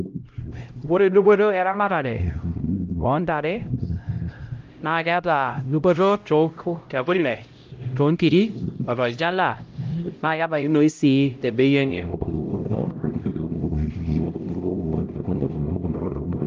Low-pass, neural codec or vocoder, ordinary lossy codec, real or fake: 7.2 kHz; codec, 16 kHz, 0.5 kbps, X-Codec, HuBERT features, trained on LibriSpeech; Opus, 32 kbps; fake